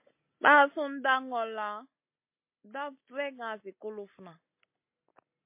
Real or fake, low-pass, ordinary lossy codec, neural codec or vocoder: real; 3.6 kHz; MP3, 24 kbps; none